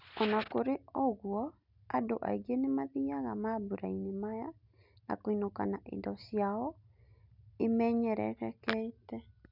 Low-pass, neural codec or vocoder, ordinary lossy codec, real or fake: 5.4 kHz; none; none; real